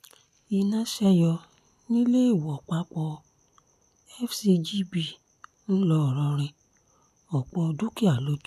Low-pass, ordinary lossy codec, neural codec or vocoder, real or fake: 14.4 kHz; none; none; real